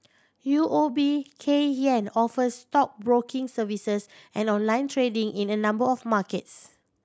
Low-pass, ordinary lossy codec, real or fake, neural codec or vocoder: none; none; real; none